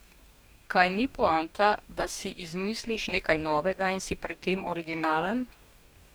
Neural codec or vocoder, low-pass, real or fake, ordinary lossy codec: codec, 44.1 kHz, 2.6 kbps, DAC; none; fake; none